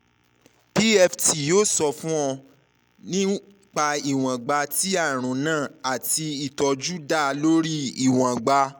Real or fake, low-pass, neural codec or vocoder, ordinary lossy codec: real; none; none; none